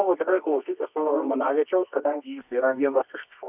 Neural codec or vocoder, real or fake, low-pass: codec, 24 kHz, 0.9 kbps, WavTokenizer, medium music audio release; fake; 3.6 kHz